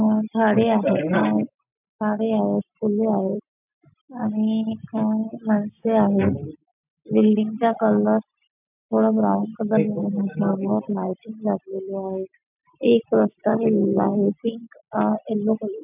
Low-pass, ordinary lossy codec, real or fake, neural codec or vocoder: 3.6 kHz; none; real; none